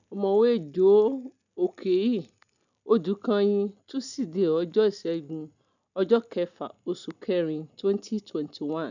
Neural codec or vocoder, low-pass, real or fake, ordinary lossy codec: none; 7.2 kHz; real; none